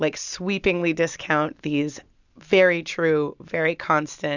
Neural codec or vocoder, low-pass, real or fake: none; 7.2 kHz; real